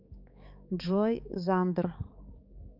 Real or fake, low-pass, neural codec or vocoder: fake; 5.4 kHz; codec, 16 kHz, 4 kbps, X-Codec, HuBERT features, trained on balanced general audio